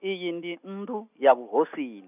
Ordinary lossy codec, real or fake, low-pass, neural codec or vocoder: none; real; 3.6 kHz; none